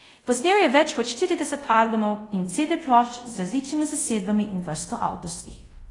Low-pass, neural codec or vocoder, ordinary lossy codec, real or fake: 10.8 kHz; codec, 24 kHz, 0.5 kbps, DualCodec; AAC, 32 kbps; fake